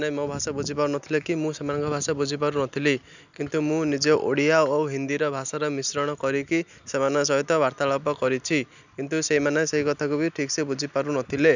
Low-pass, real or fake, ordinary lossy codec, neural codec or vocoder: 7.2 kHz; real; none; none